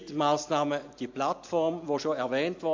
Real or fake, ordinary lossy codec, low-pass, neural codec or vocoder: real; MP3, 48 kbps; 7.2 kHz; none